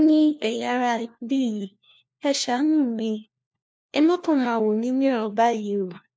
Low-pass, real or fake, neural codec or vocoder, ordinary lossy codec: none; fake; codec, 16 kHz, 1 kbps, FunCodec, trained on LibriTTS, 50 frames a second; none